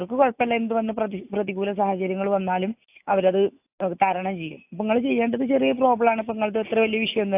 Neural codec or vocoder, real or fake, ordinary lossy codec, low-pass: none; real; AAC, 32 kbps; 3.6 kHz